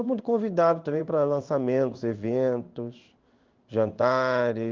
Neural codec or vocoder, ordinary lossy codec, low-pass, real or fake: codec, 16 kHz in and 24 kHz out, 1 kbps, XY-Tokenizer; Opus, 24 kbps; 7.2 kHz; fake